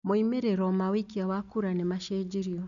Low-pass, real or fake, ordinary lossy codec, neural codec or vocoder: 7.2 kHz; real; none; none